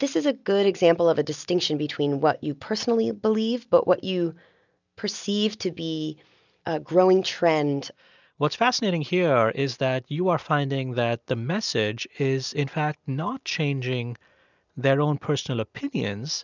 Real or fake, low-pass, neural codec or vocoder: real; 7.2 kHz; none